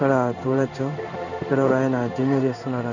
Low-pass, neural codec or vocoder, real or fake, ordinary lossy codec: 7.2 kHz; codec, 16 kHz in and 24 kHz out, 1 kbps, XY-Tokenizer; fake; none